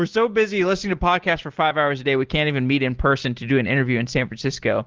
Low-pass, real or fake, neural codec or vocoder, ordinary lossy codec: 7.2 kHz; real; none; Opus, 16 kbps